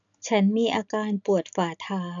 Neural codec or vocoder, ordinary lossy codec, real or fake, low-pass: none; none; real; 7.2 kHz